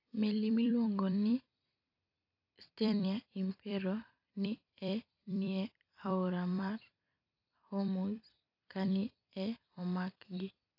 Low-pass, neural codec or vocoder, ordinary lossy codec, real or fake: 5.4 kHz; vocoder, 44.1 kHz, 128 mel bands every 256 samples, BigVGAN v2; AAC, 48 kbps; fake